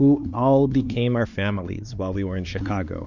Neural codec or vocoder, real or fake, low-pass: codec, 16 kHz, 2 kbps, X-Codec, WavLM features, trained on Multilingual LibriSpeech; fake; 7.2 kHz